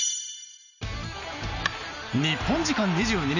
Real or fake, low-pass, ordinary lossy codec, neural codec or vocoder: real; 7.2 kHz; none; none